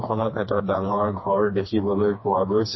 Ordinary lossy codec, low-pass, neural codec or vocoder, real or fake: MP3, 24 kbps; 7.2 kHz; codec, 16 kHz, 2 kbps, FreqCodec, smaller model; fake